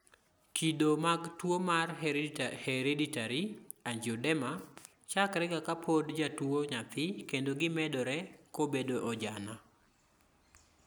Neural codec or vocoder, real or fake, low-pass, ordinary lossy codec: none; real; none; none